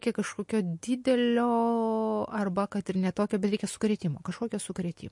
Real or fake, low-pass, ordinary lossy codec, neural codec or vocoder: real; 10.8 kHz; MP3, 48 kbps; none